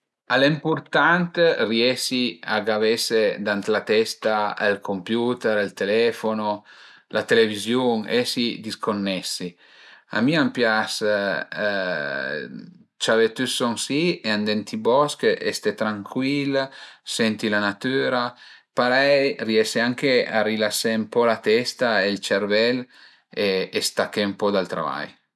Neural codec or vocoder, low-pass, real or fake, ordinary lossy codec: none; none; real; none